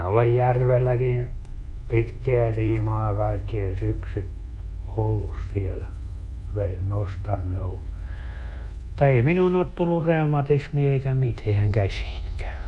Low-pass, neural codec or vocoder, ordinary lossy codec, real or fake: 10.8 kHz; codec, 24 kHz, 1.2 kbps, DualCodec; none; fake